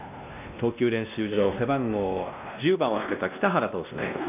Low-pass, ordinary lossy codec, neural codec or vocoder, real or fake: 3.6 kHz; none; codec, 16 kHz, 1 kbps, X-Codec, WavLM features, trained on Multilingual LibriSpeech; fake